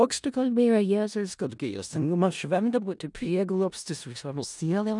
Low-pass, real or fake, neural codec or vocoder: 10.8 kHz; fake; codec, 16 kHz in and 24 kHz out, 0.4 kbps, LongCat-Audio-Codec, four codebook decoder